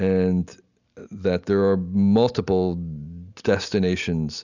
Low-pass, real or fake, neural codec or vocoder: 7.2 kHz; real; none